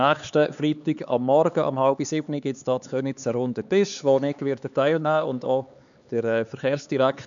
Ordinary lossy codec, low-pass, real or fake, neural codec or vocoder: none; 7.2 kHz; fake; codec, 16 kHz, 4 kbps, X-Codec, HuBERT features, trained on LibriSpeech